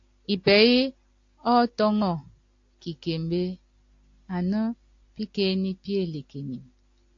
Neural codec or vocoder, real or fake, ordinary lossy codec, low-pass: none; real; AAC, 48 kbps; 7.2 kHz